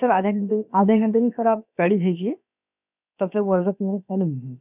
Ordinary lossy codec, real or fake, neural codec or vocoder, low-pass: none; fake; codec, 16 kHz, about 1 kbps, DyCAST, with the encoder's durations; 3.6 kHz